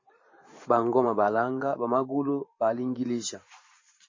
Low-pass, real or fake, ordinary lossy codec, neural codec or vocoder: 7.2 kHz; fake; MP3, 32 kbps; vocoder, 44.1 kHz, 128 mel bands every 512 samples, BigVGAN v2